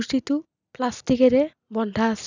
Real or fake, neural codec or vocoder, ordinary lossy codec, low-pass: real; none; none; 7.2 kHz